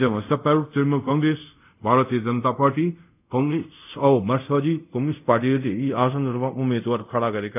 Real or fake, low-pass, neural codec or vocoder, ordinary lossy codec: fake; 3.6 kHz; codec, 24 kHz, 0.5 kbps, DualCodec; none